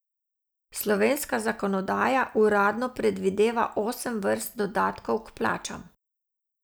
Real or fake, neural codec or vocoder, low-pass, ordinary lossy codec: real; none; none; none